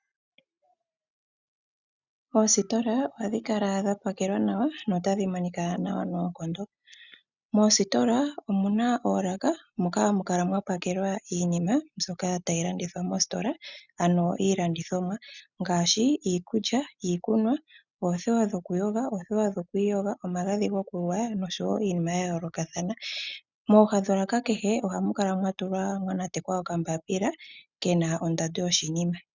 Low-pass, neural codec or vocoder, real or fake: 7.2 kHz; none; real